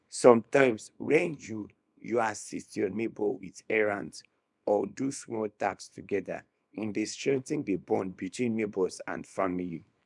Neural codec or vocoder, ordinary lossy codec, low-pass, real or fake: codec, 24 kHz, 0.9 kbps, WavTokenizer, small release; none; 10.8 kHz; fake